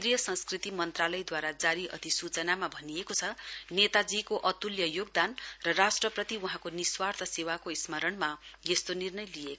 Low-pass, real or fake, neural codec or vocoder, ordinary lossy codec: none; real; none; none